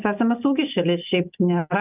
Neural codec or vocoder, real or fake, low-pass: none; real; 3.6 kHz